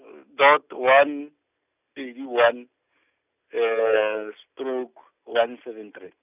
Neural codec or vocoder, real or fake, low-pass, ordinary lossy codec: none; real; 3.6 kHz; none